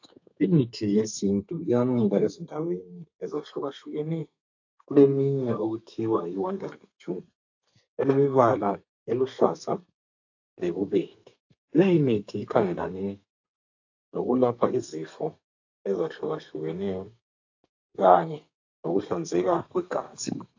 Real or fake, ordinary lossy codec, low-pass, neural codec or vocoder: fake; AAC, 48 kbps; 7.2 kHz; codec, 32 kHz, 1.9 kbps, SNAC